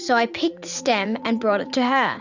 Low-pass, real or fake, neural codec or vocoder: 7.2 kHz; fake; vocoder, 44.1 kHz, 80 mel bands, Vocos